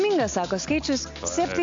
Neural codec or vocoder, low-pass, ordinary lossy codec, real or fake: none; 7.2 kHz; AAC, 48 kbps; real